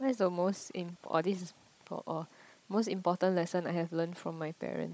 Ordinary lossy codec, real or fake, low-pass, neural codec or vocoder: none; fake; none; codec, 16 kHz, 16 kbps, FunCodec, trained on Chinese and English, 50 frames a second